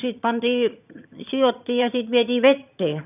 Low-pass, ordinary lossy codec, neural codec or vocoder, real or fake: 3.6 kHz; none; vocoder, 22.05 kHz, 80 mel bands, HiFi-GAN; fake